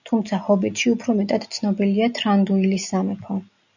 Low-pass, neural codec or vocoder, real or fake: 7.2 kHz; none; real